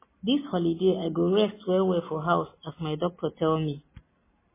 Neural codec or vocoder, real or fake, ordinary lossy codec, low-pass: none; real; MP3, 16 kbps; 3.6 kHz